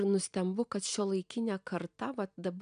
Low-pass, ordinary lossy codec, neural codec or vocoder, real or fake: 9.9 kHz; MP3, 96 kbps; none; real